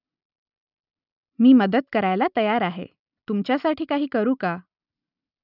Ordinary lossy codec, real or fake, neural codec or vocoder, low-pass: none; real; none; 5.4 kHz